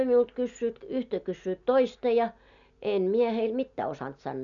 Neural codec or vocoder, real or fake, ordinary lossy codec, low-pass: none; real; none; 7.2 kHz